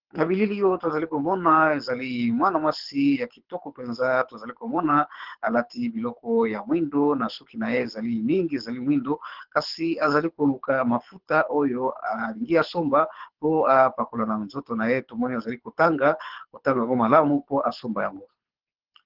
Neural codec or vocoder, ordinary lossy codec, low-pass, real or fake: codec, 24 kHz, 6 kbps, HILCodec; Opus, 16 kbps; 5.4 kHz; fake